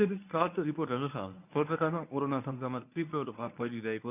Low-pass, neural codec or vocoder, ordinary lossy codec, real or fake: 3.6 kHz; codec, 24 kHz, 0.9 kbps, WavTokenizer, medium speech release version 1; none; fake